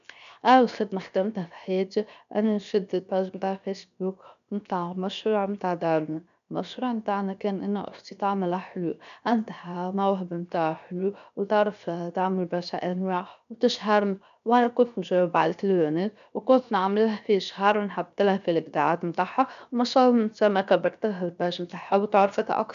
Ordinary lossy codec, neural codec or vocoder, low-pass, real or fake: none; codec, 16 kHz, 0.7 kbps, FocalCodec; 7.2 kHz; fake